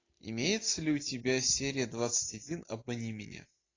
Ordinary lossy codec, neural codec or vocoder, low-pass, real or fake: AAC, 32 kbps; none; 7.2 kHz; real